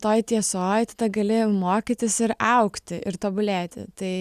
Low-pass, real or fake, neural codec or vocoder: 14.4 kHz; real; none